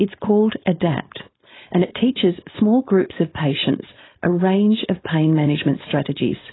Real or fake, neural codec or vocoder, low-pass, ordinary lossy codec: fake; codec, 16 kHz, 4.8 kbps, FACodec; 7.2 kHz; AAC, 16 kbps